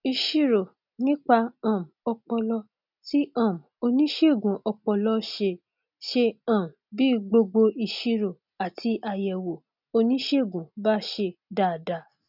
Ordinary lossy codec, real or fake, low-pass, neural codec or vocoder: none; real; 5.4 kHz; none